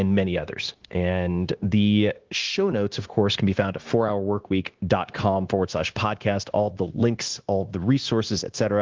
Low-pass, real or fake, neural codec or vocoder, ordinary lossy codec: 7.2 kHz; fake; codec, 16 kHz, 0.9 kbps, LongCat-Audio-Codec; Opus, 16 kbps